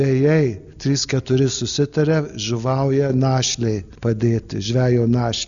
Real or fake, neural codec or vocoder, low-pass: real; none; 7.2 kHz